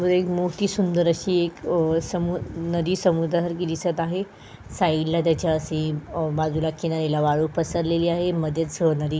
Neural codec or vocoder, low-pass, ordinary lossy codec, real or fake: none; none; none; real